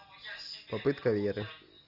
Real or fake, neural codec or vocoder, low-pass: real; none; 5.4 kHz